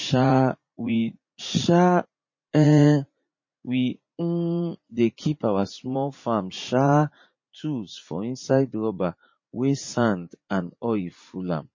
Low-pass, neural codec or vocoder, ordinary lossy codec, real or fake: 7.2 kHz; vocoder, 44.1 kHz, 80 mel bands, Vocos; MP3, 32 kbps; fake